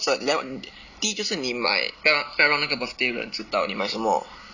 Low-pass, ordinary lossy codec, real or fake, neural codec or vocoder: 7.2 kHz; none; real; none